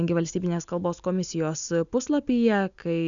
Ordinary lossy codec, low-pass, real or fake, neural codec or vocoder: MP3, 96 kbps; 7.2 kHz; real; none